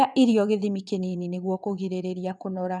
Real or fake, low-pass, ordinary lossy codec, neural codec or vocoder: fake; none; none; vocoder, 22.05 kHz, 80 mel bands, WaveNeXt